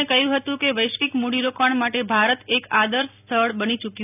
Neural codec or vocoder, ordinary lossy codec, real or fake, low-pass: none; none; real; 3.6 kHz